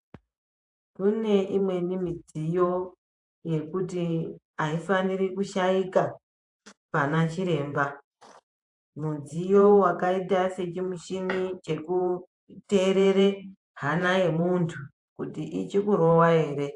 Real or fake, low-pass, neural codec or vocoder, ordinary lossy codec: fake; 10.8 kHz; vocoder, 48 kHz, 128 mel bands, Vocos; AAC, 64 kbps